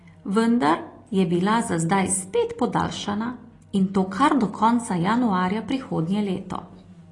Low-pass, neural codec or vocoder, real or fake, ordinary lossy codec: 10.8 kHz; none; real; AAC, 32 kbps